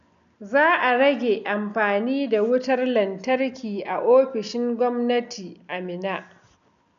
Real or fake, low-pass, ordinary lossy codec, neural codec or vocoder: real; 7.2 kHz; none; none